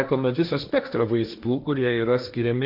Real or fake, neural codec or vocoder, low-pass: fake; codec, 16 kHz, 1.1 kbps, Voila-Tokenizer; 5.4 kHz